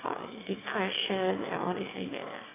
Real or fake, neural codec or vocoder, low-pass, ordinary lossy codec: fake; autoencoder, 22.05 kHz, a latent of 192 numbers a frame, VITS, trained on one speaker; 3.6 kHz; AAC, 16 kbps